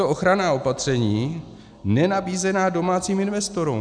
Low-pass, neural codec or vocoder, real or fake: 9.9 kHz; none; real